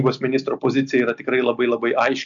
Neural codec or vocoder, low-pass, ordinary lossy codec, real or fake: none; 7.2 kHz; MP3, 64 kbps; real